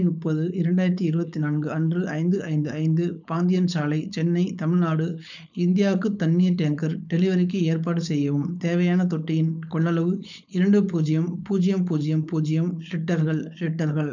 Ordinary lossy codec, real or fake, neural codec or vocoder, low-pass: none; fake; codec, 16 kHz, 4.8 kbps, FACodec; 7.2 kHz